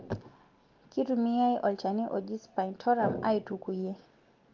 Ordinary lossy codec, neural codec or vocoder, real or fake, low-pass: Opus, 32 kbps; none; real; 7.2 kHz